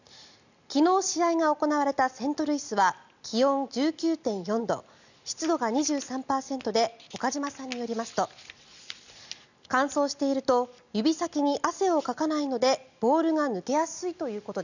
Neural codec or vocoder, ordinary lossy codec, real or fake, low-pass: none; none; real; 7.2 kHz